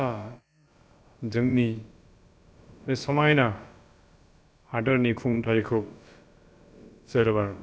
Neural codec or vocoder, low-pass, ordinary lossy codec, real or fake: codec, 16 kHz, about 1 kbps, DyCAST, with the encoder's durations; none; none; fake